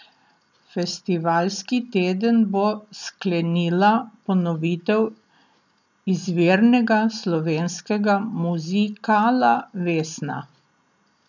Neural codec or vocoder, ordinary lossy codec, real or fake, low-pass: none; none; real; 7.2 kHz